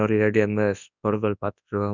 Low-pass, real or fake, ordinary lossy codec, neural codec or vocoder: 7.2 kHz; fake; none; codec, 24 kHz, 0.9 kbps, WavTokenizer, large speech release